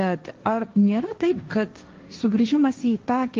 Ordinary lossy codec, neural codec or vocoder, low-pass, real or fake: Opus, 32 kbps; codec, 16 kHz, 1.1 kbps, Voila-Tokenizer; 7.2 kHz; fake